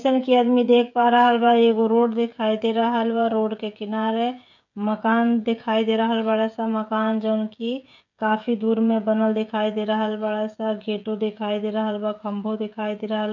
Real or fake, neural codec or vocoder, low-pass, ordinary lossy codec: fake; codec, 16 kHz, 16 kbps, FreqCodec, smaller model; 7.2 kHz; none